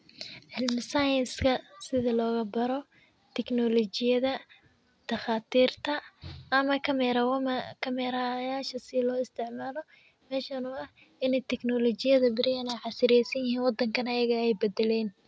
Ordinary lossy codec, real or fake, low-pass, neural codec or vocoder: none; real; none; none